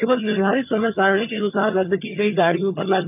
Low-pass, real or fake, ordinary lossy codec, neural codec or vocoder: 3.6 kHz; fake; none; vocoder, 22.05 kHz, 80 mel bands, HiFi-GAN